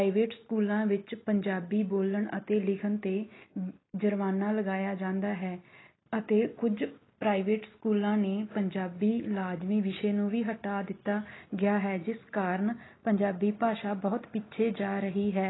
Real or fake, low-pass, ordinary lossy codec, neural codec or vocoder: real; 7.2 kHz; AAC, 16 kbps; none